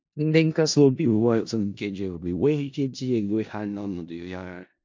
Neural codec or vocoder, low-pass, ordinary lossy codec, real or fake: codec, 16 kHz in and 24 kHz out, 0.4 kbps, LongCat-Audio-Codec, four codebook decoder; 7.2 kHz; MP3, 48 kbps; fake